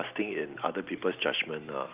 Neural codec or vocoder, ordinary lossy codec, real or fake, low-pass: none; Opus, 32 kbps; real; 3.6 kHz